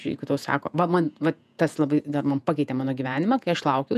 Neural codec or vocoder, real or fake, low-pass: vocoder, 48 kHz, 128 mel bands, Vocos; fake; 14.4 kHz